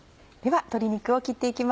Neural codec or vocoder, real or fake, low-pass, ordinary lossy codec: none; real; none; none